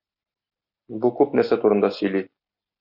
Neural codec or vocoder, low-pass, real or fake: none; 5.4 kHz; real